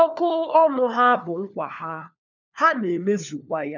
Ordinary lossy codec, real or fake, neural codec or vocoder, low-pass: none; fake; codec, 16 kHz, 4 kbps, FunCodec, trained on LibriTTS, 50 frames a second; 7.2 kHz